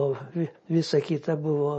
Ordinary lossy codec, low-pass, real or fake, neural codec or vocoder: MP3, 32 kbps; 10.8 kHz; real; none